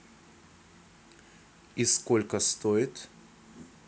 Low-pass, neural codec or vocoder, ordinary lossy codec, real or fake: none; none; none; real